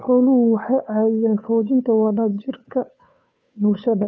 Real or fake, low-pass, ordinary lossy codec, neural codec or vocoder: fake; none; none; codec, 16 kHz, 2 kbps, FunCodec, trained on Chinese and English, 25 frames a second